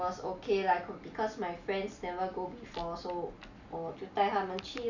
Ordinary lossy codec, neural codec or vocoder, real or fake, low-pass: none; none; real; 7.2 kHz